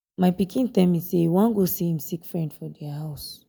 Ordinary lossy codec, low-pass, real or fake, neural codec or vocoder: none; none; real; none